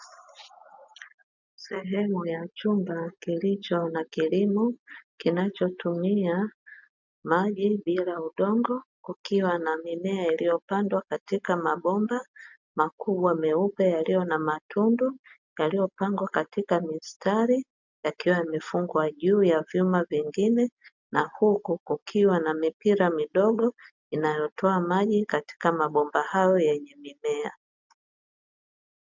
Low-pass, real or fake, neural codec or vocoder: 7.2 kHz; real; none